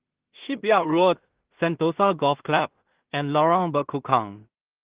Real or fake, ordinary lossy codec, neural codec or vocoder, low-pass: fake; Opus, 16 kbps; codec, 16 kHz in and 24 kHz out, 0.4 kbps, LongCat-Audio-Codec, two codebook decoder; 3.6 kHz